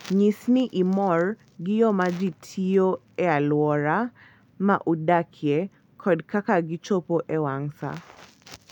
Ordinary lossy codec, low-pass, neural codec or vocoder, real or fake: none; 19.8 kHz; none; real